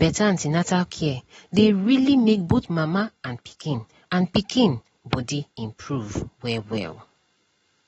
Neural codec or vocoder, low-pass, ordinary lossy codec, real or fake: none; 19.8 kHz; AAC, 24 kbps; real